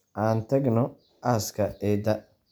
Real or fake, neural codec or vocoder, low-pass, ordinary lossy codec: real; none; none; none